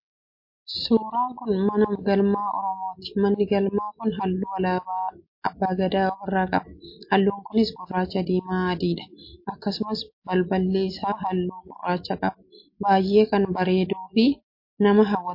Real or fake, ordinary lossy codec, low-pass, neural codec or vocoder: real; MP3, 32 kbps; 5.4 kHz; none